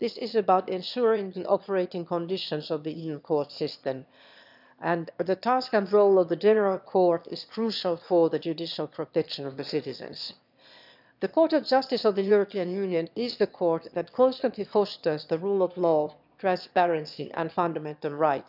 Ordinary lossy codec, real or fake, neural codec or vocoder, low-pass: none; fake; autoencoder, 22.05 kHz, a latent of 192 numbers a frame, VITS, trained on one speaker; 5.4 kHz